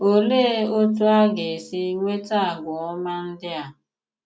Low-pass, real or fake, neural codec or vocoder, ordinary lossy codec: none; real; none; none